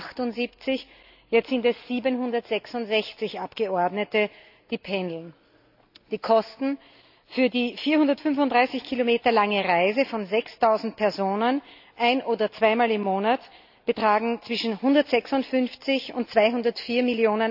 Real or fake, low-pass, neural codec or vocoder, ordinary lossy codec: real; 5.4 kHz; none; AAC, 48 kbps